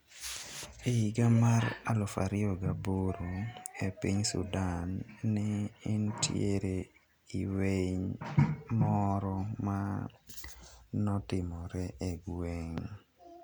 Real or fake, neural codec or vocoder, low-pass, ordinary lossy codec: real; none; none; none